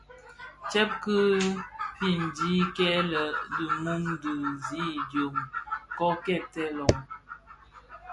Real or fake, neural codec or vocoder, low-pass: real; none; 10.8 kHz